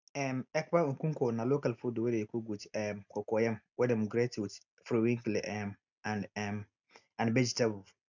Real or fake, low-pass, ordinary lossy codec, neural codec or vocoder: real; 7.2 kHz; none; none